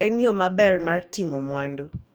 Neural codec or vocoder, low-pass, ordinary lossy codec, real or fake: codec, 44.1 kHz, 2.6 kbps, DAC; none; none; fake